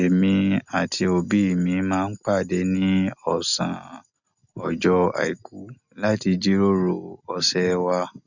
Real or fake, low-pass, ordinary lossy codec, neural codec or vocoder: real; 7.2 kHz; none; none